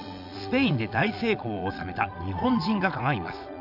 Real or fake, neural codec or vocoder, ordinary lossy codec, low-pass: fake; vocoder, 22.05 kHz, 80 mel bands, Vocos; none; 5.4 kHz